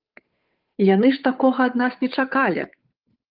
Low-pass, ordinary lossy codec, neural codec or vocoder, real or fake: 5.4 kHz; Opus, 24 kbps; codec, 16 kHz, 8 kbps, FunCodec, trained on Chinese and English, 25 frames a second; fake